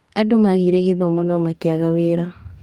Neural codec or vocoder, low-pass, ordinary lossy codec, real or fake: codec, 44.1 kHz, 2.6 kbps, DAC; 19.8 kHz; Opus, 32 kbps; fake